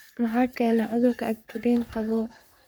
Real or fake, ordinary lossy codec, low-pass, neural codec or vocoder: fake; none; none; codec, 44.1 kHz, 3.4 kbps, Pupu-Codec